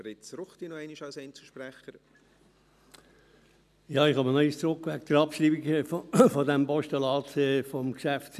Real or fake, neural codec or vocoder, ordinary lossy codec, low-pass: real; none; none; 14.4 kHz